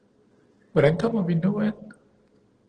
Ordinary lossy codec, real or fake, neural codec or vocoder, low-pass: Opus, 16 kbps; real; none; 9.9 kHz